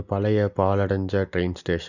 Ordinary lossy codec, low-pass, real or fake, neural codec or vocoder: none; 7.2 kHz; real; none